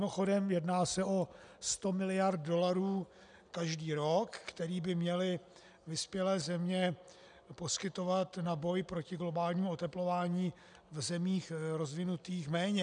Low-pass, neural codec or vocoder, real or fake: 9.9 kHz; none; real